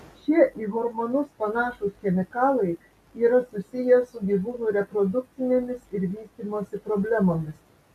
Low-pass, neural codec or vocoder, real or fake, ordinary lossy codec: 14.4 kHz; none; real; AAC, 96 kbps